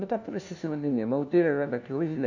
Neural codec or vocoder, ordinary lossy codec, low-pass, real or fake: codec, 16 kHz, 1 kbps, FunCodec, trained on LibriTTS, 50 frames a second; none; 7.2 kHz; fake